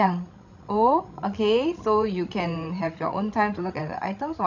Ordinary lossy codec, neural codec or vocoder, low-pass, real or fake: none; codec, 16 kHz, 16 kbps, FreqCodec, larger model; 7.2 kHz; fake